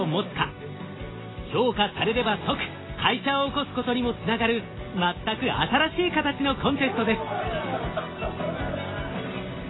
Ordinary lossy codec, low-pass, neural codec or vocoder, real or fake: AAC, 16 kbps; 7.2 kHz; none; real